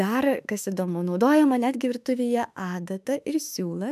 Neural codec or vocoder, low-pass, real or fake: autoencoder, 48 kHz, 32 numbers a frame, DAC-VAE, trained on Japanese speech; 14.4 kHz; fake